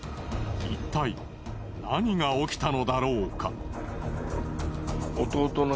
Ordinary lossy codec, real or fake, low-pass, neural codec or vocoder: none; real; none; none